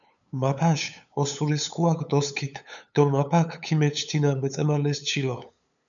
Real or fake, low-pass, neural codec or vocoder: fake; 7.2 kHz; codec, 16 kHz, 8 kbps, FunCodec, trained on LibriTTS, 25 frames a second